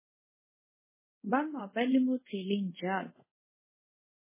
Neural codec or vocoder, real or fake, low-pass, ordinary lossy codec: codec, 24 kHz, 0.5 kbps, DualCodec; fake; 3.6 kHz; MP3, 16 kbps